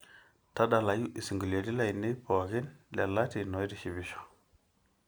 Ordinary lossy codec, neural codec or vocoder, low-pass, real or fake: none; none; none; real